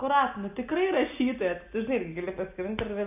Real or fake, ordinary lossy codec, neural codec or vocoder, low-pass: real; AAC, 32 kbps; none; 3.6 kHz